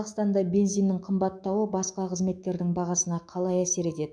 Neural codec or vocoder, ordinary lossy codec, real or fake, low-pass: autoencoder, 48 kHz, 128 numbers a frame, DAC-VAE, trained on Japanese speech; none; fake; 9.9 kHz